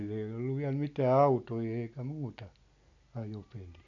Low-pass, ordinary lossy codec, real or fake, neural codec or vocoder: 7.2 kHz; none; real; none